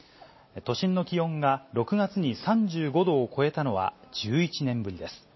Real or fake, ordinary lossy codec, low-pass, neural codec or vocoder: real; MP3, 24 kbps; 7.2 kHz; none